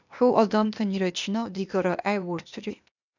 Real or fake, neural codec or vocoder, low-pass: fake; codec, 16 kHz, 0.8 kbps, ZipCodec; 7.2 kHz